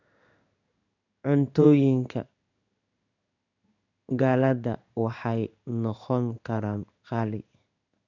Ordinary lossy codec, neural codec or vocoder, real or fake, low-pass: none; codec, 16 kHz in and 24 kHz out, 1 kbps, XY-Tokenizer; fake; 7.2 kHz